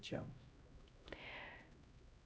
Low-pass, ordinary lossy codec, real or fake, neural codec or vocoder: none; none; fake; codec, 16 kHz, 0.5 kbps, X-Codec, HuBERT features, trained on LibriSpeech